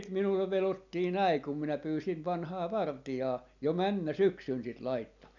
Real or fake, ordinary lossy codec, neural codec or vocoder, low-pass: real; none; none; 7.2 kHz